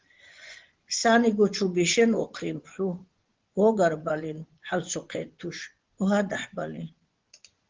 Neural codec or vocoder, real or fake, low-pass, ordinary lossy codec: vocoder, 24 kHz, 100 mel bands, Vocos; fake; 7.2 kHz; Opus, 16 kbps